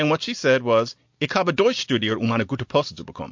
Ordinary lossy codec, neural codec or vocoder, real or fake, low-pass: MP3, 48 kbps; none; real; 7.2 kHz